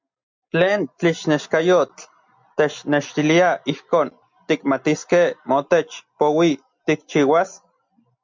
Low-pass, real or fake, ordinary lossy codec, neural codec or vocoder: 7.2 kHz; real; MP3, 64 kbps; none